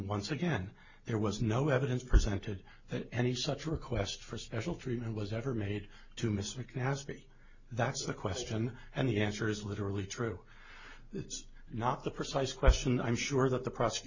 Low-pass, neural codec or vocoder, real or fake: 7.2 kHz; none; real